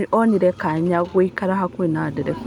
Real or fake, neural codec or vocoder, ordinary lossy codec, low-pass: real; none; none; 19.8 kHz